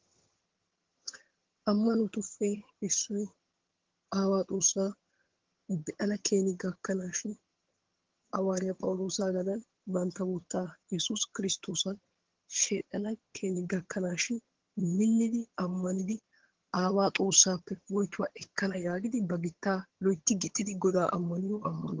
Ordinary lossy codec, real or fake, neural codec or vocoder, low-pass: Opus, 16 kbps; fake; vocoder, 22.05 kHz, 80 mel bands, HiFi-GAN; 7.2 kHz